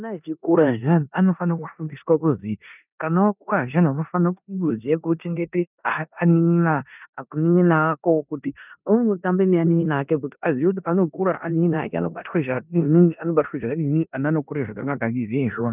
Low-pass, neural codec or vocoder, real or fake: 3.6 kHz; codec, 16 kHz in and 24 kHz out, 0.9 kbps, LongCat-Audio-Codec, four codebook decoder; fake